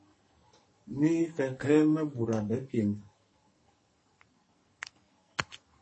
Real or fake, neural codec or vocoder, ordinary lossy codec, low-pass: fake; codec, 44.1 kHz, 2.6 kbps, SNAC; MP3, 32 kbps; 10.8 kHz